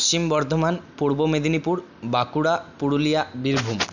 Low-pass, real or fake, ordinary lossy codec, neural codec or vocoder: 7.2 kHz; real; none; none